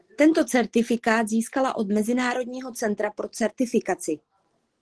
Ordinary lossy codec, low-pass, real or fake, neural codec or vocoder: Opus, 16 kbps; 10.8 kHz; real; none